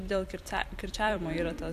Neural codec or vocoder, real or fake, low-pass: vocoder, 44.1 kHz, 128 mel bands every 256 samples, BigVGAN v2; fake; 14.4 kHz